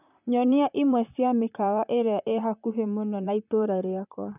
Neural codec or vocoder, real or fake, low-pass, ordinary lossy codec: vocoder, 44.1 kHz, 128 mel bands, Pupu-Vocoder; fake; 3.6 kHz; none